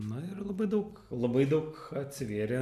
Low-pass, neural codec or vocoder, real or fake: 14.4 kHz; none; real